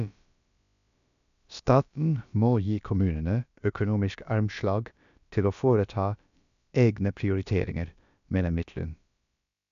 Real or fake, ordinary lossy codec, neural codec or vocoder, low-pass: fake; none; codec, 16 kHz, about 1 kbps, DyCAST, with the encoder's durations; 7.2 kHz